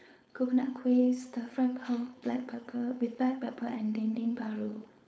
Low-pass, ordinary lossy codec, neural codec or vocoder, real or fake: none; none; codec, 16 kHz, 4.8 kbps, FACodec; fake